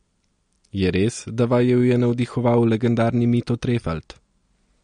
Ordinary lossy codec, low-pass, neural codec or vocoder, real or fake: MP3, 48 kbps; 9.9 kHz; none; real